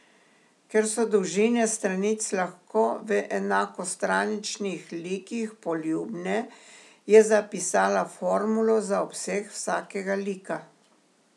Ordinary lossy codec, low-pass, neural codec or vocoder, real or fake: none; none; none; real